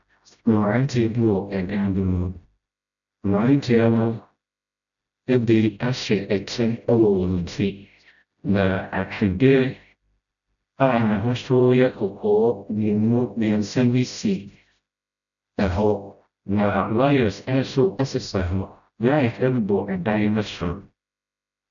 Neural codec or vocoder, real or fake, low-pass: codec, 16 kHz, 0.5 kbps, FreqCodec, smaller model; fake; 7.2 kHz